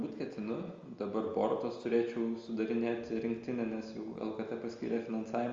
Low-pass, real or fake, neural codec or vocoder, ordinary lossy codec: 7.2 kHz; real; none; Opus, 32 kbps